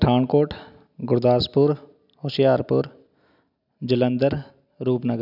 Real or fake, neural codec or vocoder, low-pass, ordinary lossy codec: real; none; 5.4 kHz; none